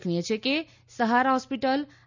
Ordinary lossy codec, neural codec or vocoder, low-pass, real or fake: none; none; 7.2 kHz; real